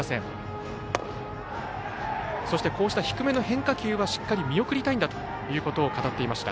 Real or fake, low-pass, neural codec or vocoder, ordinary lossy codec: real; none; none; none